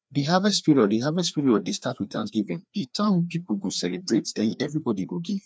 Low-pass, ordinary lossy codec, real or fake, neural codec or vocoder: none; none; fake; codec, 16 kHz, 2 kbps, FreqCodec, larger model